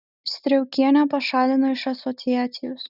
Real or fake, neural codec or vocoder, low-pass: real; none; 5.4 kHz